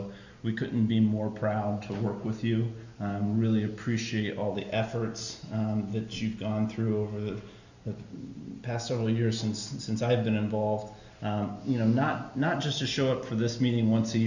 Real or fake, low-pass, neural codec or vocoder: real; 7.2 kHz; none